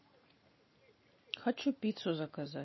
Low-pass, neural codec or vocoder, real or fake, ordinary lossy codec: 7.2 kHz; none; real; MP3, 24 kbps